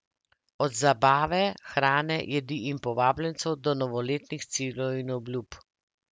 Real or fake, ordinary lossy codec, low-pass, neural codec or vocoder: real; none; none; none